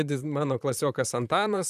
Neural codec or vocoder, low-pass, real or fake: vocoder, 44.1 kHz, 128 mel bands, Pupu-Vocoder; 14.4 kHz; fake